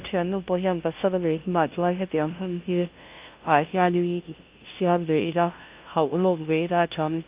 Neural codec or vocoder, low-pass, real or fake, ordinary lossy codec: codec, 16 kHz, 0.5 kbps, FunCodec, trained on LibriTTS, 25 frames a second; 3.6 kHz; fake; Opus, 32 kbps